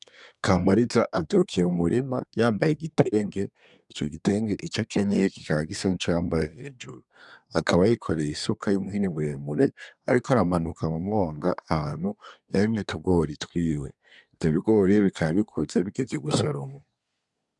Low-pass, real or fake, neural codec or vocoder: 10.8 kHz; fake; codec, 24 kHz, 1 kbps, SNAC